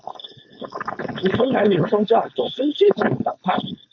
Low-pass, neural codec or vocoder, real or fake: 7.2 kHz; codec, 16 kHz, 4.8 kbps, FACodec; fake